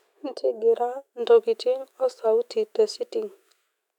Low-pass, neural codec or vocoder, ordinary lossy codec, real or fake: 19.8 kHz; autoencoder, 48 kHz, 128 numbers a frame, DAC-VAE, trained on Japanese speech; none; fake